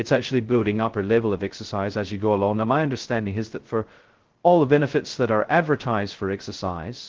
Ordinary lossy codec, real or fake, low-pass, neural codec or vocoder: Opus, 16 kbps; fake; 7.2 kHz; codec, 16 kHz, 0.2 kbps, FocalCodec